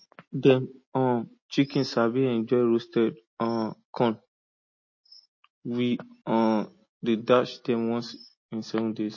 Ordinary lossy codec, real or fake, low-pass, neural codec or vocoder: MP3, 32 kbps; real; 7.2 kHz; none